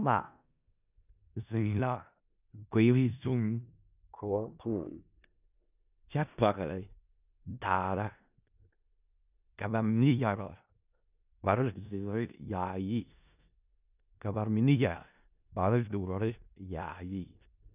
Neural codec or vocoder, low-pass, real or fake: codec, 16 kHz in and 24 kHz out, 0.4 kbps, LongCat-Audio-Codec, four codebook decoder; 3.6 kHz; fake